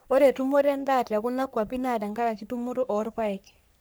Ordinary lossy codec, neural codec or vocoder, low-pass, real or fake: none; codec, 44.1 kHz, 3.4 kbps, Pupu-Codec; none; fake